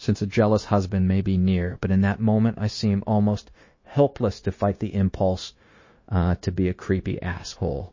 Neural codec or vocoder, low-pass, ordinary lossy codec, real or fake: codec, 16 kHz, 0.9 kbps, LongCat-Audio-Codec; 7.2 kHz; MP3, 32 kbps; fake